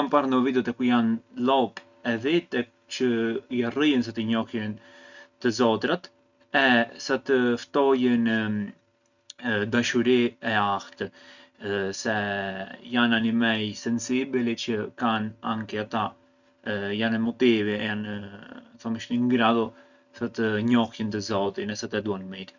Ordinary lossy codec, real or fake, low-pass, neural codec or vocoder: none; real; 7.2 kHz; none